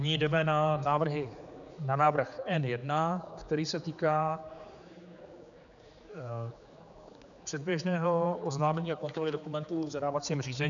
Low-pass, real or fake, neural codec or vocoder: 7.2 kHz; fake; codec, 16 kHz, 4 kbps, X-Codec, HuBERT features, trained on general audio